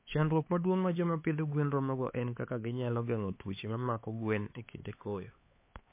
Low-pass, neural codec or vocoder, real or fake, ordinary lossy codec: 3.6 kHz; codec, 16 kHz, 4 kbps, X-Codec, HuBERT features, trained on LibriSpeech; fake; MP3, 24 kbps